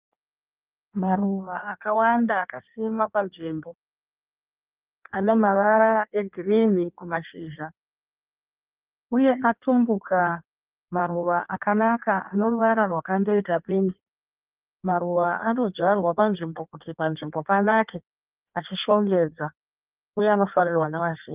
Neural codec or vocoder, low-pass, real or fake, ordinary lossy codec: codec, 16 kHz in and 24 kHz out, 1.1 kbps, FireRedTTS-2 codec; 3.6 kHz; fake; Opus, 24 kbps